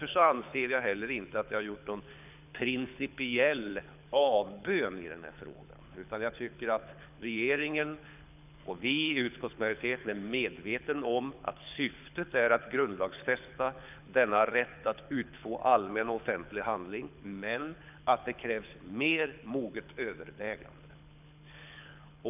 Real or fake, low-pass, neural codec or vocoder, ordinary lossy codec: fake; 3.6 kHz; codec, 24 kHz, 6 kbps, HILCodec; none